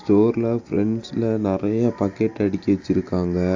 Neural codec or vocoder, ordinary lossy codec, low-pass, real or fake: none; AAC, 48 kbps; 7.2 kHz; real